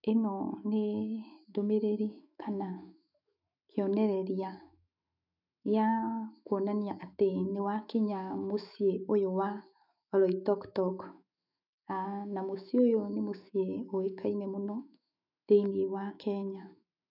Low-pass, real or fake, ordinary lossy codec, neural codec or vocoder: 5.4 kHz; fake; none; autoencoder, 48 kHz, 128 numbers a frame, DAC-VAE, trained on Japanese speech